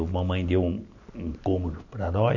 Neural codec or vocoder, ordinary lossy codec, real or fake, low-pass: none; none; real; 7.2 kHz